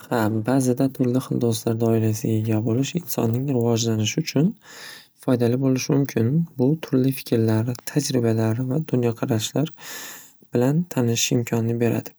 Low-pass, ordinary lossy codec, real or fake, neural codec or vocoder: none; none; real; none